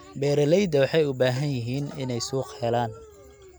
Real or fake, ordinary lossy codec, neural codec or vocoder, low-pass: real; none; none; none